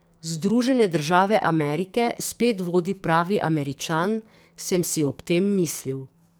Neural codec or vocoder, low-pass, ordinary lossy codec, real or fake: codec, 44.1 kHz, 2.6 kbps, SNAC; none; none; fake